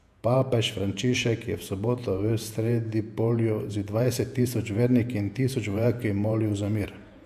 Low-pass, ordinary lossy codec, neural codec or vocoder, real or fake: 14.4 kHz; AAC, 96 kbps; vocoder, 48 kHz, 128 mel bands, Vocos; fake